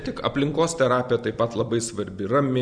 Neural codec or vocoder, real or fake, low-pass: none; real; 9.9 kHz